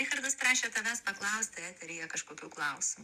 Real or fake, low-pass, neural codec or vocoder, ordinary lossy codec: real; 14.4 kHz; none; Opus, 64 kbps